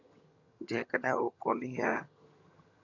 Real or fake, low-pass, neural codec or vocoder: fake; 7.2 kHz; vocoder, 22.05 kHz, 80 mel bands, HiFi-GAN